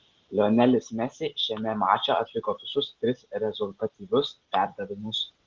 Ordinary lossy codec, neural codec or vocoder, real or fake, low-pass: Opus, 16 kbps; none; real; 7.2 kHz